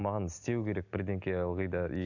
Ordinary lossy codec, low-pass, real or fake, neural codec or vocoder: none; 7.2 kHz; real; none